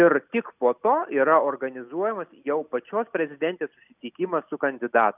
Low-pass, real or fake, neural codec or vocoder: 3.6 kHz; real; none